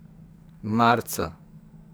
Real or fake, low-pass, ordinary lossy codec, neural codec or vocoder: fake; none; none; codec, 44.1 kHz, 2.6 kbps, SNAC